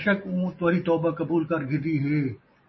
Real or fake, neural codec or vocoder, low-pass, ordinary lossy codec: real; none; 7.2 kHz; MP3, 24 kbps